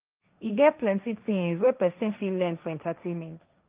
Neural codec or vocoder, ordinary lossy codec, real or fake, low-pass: codec, 16 kHz, 1.1 kbps, Voila-Tokenizer; Opus, 24 kbps; fake; 3.6 kHz